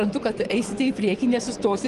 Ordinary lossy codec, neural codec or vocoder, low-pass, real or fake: Opus, 24 kbps; vocoder, 24 kHz, 100 mel bands, Vocos; 10.8 kHz; fake